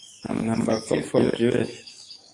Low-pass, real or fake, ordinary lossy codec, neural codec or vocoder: 10.8 kHz; fake; AAC, 48 kbps; codec, 44.1 kHz, 7.8 kbps, DAC